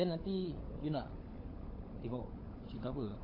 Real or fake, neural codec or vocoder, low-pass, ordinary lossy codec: fake; codec, 16 kHz, 16 kbps, FunCodec, trained on LibriTTS, 50 frames a second; 5.4 kHz; AAC, 24 kbps